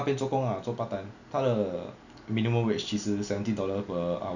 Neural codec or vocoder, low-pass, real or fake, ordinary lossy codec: none; 7.2 kHz; real; none